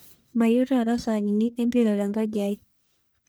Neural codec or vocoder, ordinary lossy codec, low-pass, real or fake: codec, 44.1 kHz, 1.7 kbps, Pupu-Codec; none; none; fake